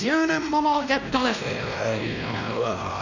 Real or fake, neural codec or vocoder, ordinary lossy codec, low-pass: fake; codec, 16 kHz, 1 kbps, X-Codec, WavLM features, trained on Multilingual LibriSpeech; none; 7.2 kHz